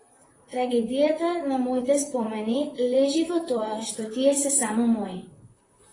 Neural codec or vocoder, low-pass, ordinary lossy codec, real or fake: vocoder, 44.1 kHz, 128 mel bands, Pupu-Vocoder; 10.8 kHz; AAC, 32 kbps; fake